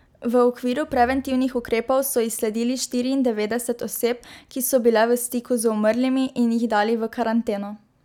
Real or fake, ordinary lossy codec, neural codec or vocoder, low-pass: real; none; none; 19.8 kHz